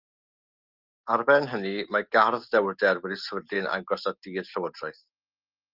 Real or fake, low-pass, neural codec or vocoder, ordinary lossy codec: real; 5.4 kHz; none; Opus, 24 kbps